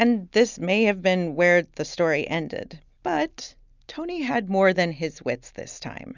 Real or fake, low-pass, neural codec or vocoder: real; 7.2 kHz; none